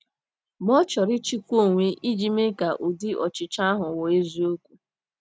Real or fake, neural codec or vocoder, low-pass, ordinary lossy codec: real; none; none; none